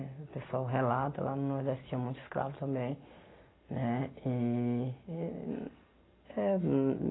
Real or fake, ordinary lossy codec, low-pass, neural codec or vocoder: real; AAC, 16 kbps; 7.2 kHz; none